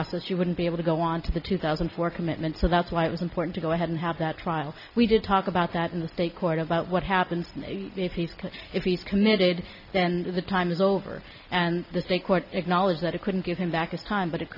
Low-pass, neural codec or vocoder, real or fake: 5.4 kHz; none; real